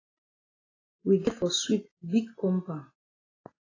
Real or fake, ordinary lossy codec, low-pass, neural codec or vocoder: real; AAC, 32 kbps; 7.2 kHz; none